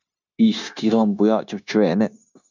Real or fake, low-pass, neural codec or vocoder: fake; 7.2 kHz; codec, 16 kHz, 0.9 kbps, LongCat-Audio-Codec